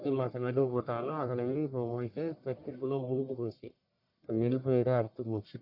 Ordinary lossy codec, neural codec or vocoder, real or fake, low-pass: none; codec, 44.1 kHz, 1.7 kbps, Pupu-Codec; fake; 5.4 kHz